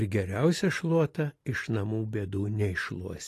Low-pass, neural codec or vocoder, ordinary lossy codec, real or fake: 14.4 kHz; none; AAC, 48 kbps; real